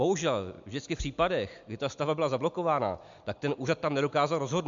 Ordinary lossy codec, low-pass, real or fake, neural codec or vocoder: MP3, 64 kbps; 7.2 kHz; real; none